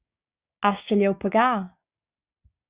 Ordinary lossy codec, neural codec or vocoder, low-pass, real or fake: Opus, 64 kbps; codec, 16 kHz, 6 kbps, DAC; 3.6 kHz; fake